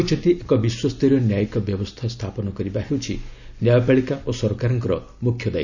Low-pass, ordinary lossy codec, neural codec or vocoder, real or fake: 7.2 kHz; none; none; real